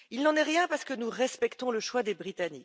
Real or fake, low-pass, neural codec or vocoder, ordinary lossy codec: real; none; none; none